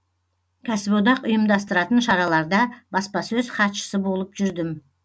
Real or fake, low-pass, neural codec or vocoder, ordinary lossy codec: real; none; none; none